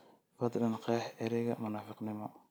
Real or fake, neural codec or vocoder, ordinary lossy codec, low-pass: real; none; none; none